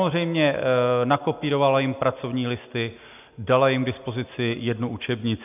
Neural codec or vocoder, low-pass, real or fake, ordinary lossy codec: none; 3.6 kHz; real; AAC, 32 kbps